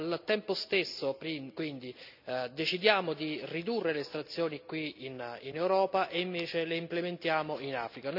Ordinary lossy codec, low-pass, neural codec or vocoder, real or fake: none; 5.4 kHz; none; real